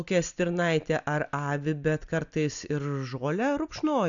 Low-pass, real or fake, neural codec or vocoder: 7.2 kHz; real; none